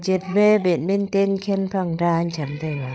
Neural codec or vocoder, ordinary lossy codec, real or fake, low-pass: codec, 16 kHz, 8 kbps, FreqCodec, larger model; none; fake; none